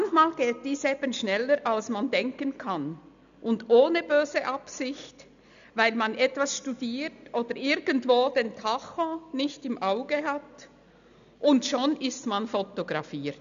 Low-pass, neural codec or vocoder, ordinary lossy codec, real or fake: 7.2 kHz; none; AAC, 96 kbps; real